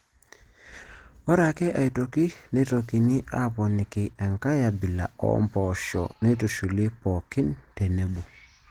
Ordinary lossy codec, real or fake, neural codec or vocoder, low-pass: Opus, 16 kbps; real; none; 19.8 kHz